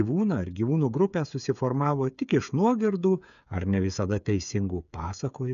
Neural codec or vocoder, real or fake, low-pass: codec, 16 kHz, 8 kbps, FreqCodec, smaller model; fake; 7.2 kHz